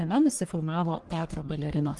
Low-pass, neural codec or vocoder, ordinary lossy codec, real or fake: 10.8 kHz; codec, 44.1 kHz, 1.7 kbps, Pupu-Codec; Opus, 24 kbps; fake